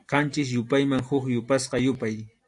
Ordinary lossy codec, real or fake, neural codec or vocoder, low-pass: AAC, 64 kbps; real; none; 10.8 kHz